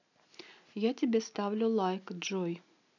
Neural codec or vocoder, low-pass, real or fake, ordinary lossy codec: none; 7.2 kHz; real; none